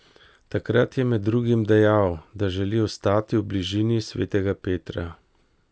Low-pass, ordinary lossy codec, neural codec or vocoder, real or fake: none; none; none; real